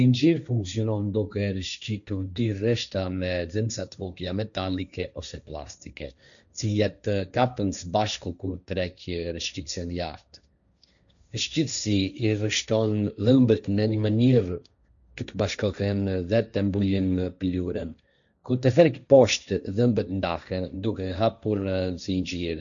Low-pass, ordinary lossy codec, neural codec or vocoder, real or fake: 7.2 kHz; none; codec, 16 kHz, 1.1 kbps, Voila-Tokenizer; fake